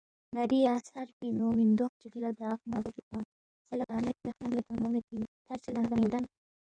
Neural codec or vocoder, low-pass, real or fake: codec, 16 kHz in and 24 kHz out, 1.1 kbps, FireRedTTS-2 codec; 9.9 kHz; fake